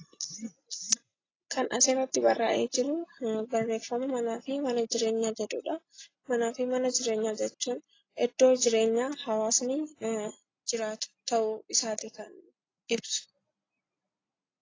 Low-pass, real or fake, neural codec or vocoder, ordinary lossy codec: 7.2 kHz; real; none; AAC, 32 kbps